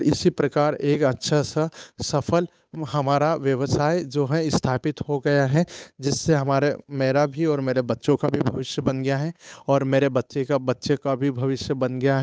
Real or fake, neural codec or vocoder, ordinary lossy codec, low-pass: fake; codec, 16 kHz, 8 kbps, FunCodec, trained on Chinese and English, 25 frames a second; none; none